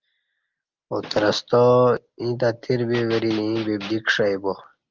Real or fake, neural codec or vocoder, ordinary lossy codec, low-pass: real; none; Opus, 32 kbps; 7.2 kHz